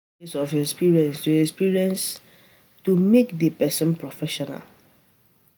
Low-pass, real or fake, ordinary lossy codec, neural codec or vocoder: none; real; none; none